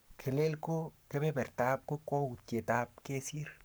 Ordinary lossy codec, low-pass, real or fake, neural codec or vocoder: none; none; fake; codec, 44.1 kHz, 7.8 kbps, Pupu-Codec